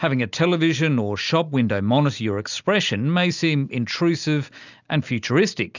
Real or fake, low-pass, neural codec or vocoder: real; 7.2 kHz; none